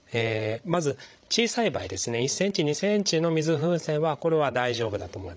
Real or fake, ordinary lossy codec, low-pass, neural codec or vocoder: fake; none; none; codec, 16 kHz, 16 kbps, FreqCodec, larger model